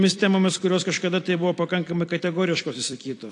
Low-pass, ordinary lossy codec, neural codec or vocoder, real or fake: 10.8 kHz; AAC, 48 kbps; vocoder, 44.1 kHz, 128 mel bands every 256 samples, BigVGAN v2; fake